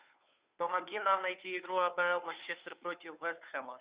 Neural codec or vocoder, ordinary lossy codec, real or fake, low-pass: codec, 16 kHz, 2 kbps, FunCodec, trained on Chinese and English, 25 frames a second; Opus, 64 kbps; fake; 3.6 kHz